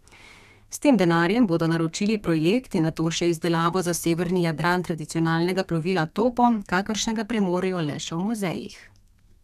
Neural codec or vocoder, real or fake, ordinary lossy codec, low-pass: codec, 32 kHz, 1.9 kbps, SNAC; fake; none; 14.4 kHz